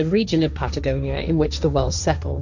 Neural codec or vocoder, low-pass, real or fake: codec, 16 kHz, 1.1 kbps, Voila-Tokenizer; 7.2 kHz; fake